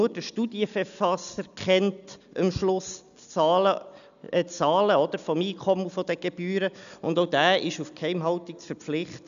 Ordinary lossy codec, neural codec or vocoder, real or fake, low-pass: none; none; real; 7.2 kHz